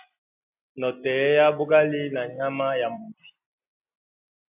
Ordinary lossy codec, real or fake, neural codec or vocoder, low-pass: AAC, 32 kbps; real; none; 3.6 kHz